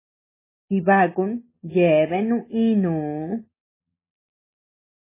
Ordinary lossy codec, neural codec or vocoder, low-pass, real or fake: MP3, 16 kbps; none; 3.6 kHz; real